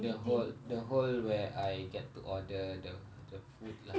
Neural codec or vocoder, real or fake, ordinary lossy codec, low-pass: none; real; none; none